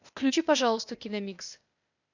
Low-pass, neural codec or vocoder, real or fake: 7.2 kHz; codec, 16 kHz, 0.8 kbps, ZipCodec; fake